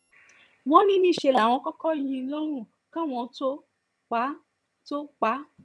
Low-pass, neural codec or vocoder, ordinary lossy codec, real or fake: none; vocoder, 22.05 kHz, 80 mel bands, HiFi-GAN; none; fake